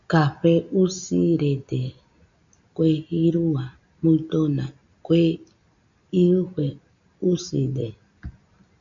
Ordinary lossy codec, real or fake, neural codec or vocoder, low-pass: MP3, 64 kbps; real; none; 7.2 kHz